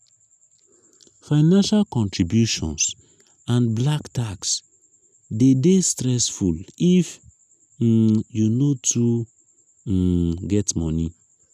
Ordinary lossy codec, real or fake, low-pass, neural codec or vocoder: none; real; 14.4 kHz; none